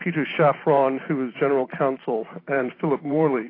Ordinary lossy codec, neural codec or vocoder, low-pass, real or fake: AAC, 24 kbps; vocoder, 44.1 kHz, 128 mel bands every 512 samples, BigVGAN v2; 5.4 kHz; fake